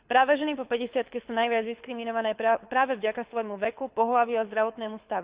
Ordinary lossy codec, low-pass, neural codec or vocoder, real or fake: Opus, 64 kbps; 3.6 kHz; codec, 24 kHz, 6 kbps, HILCodec; fake